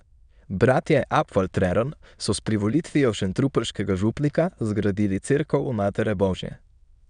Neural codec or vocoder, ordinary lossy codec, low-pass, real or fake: autoencoder, 22.05 kHz, a latent of 192 numbers a frame, VITS, trained on many speakers; none; 9.9 kHz; fake